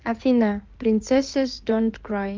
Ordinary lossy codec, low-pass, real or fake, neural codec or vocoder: Opus, 32 kbps; 7.2 kHz; fake; codec, 16 kHz in and 24 kHz out, 2.2 kbps, FireRedTTS-2 codec